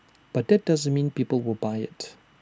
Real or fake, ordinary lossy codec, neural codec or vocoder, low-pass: real; none; none; none